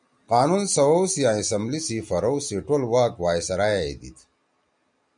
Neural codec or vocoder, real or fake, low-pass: none; real; 9.9 kHz